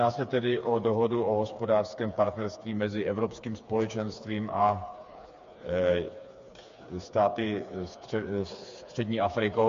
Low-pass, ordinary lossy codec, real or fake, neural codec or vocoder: 7.2 kHz; MP3, 48 kbps; fake; codec, 16 kHz, 4 kbps, FreqCodec, smaller model